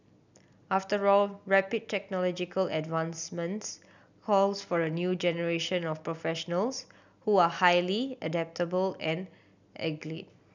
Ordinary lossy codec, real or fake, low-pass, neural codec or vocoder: none; real; 7.2 kHz; none